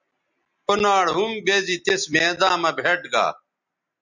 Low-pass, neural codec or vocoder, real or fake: 7.2 kHz; none; real